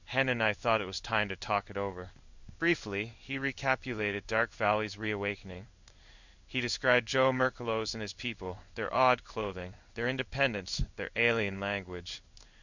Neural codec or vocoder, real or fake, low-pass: codec, 16 kHz in and 24 kHz out, 1 kbps, XY-Tokenizer; fake; 7.2 kHz